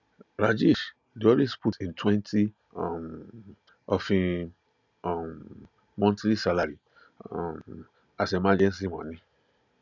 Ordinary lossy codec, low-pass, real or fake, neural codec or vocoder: none; 7.2 kHz; real; none